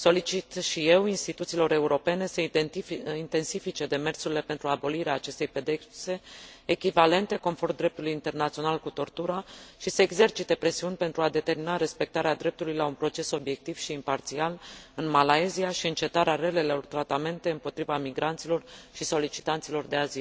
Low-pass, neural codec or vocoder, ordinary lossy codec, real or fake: none; none; none; real